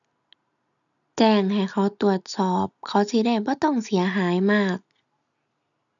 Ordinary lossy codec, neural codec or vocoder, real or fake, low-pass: none; none; real; 7.2 kHz